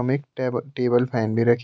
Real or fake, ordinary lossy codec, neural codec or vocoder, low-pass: real; none; none; none